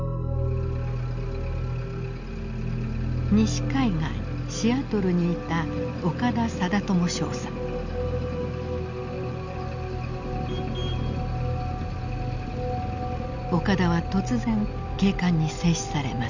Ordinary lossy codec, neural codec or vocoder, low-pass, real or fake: none; none; 7.2 kHz; real